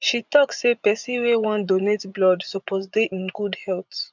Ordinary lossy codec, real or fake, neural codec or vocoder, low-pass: none; fake; vocoder, 24 kHz, 100 mel bands, Vocos; 7.2 kHz